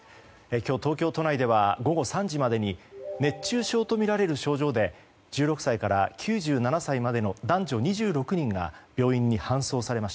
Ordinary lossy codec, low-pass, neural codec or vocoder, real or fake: none; none; none; real